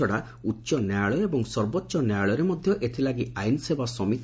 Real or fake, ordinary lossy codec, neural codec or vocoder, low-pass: real; none; none; none